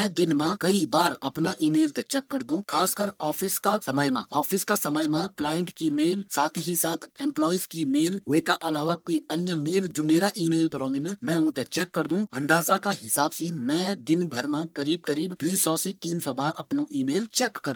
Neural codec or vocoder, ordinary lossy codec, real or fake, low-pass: codec, 44.1 kHz, 1.7 kbps, Pupu-Codec; none; fake; none